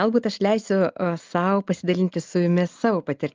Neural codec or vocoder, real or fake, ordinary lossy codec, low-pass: none; real; Opus, 24 kbps; 7.2 kHz